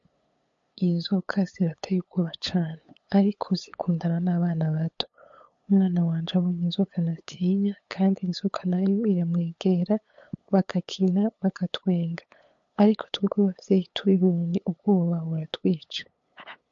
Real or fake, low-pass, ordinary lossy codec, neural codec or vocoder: fake; 7.2 kHz; MP3, 48 kbps; codec, 16 kHz, 8 kbps, FunCodec, trained on LibriTTS, 25 frames a second